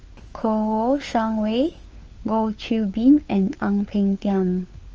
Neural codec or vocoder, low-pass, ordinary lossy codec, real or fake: codec, 16 kHz, 4 kbps, FunCodec, trained on LibriTTS, 50 frames a second; 7.2 kHz; Opus, 24 kbps; fake